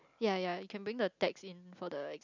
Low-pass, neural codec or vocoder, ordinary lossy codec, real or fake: 7.2 kHz; none; none; real